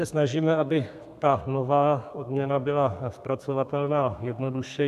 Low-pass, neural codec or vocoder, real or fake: 14.4 kHz; codec, 32 kHz, 1.9 kbps, SNAC; fake